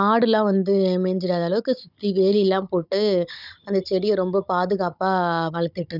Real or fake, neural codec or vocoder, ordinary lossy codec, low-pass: fake; codec, 16 kHz, 16 kbps, FunCodec, trained on Chinese and English, 50 frames a second; none; 5.4 kHz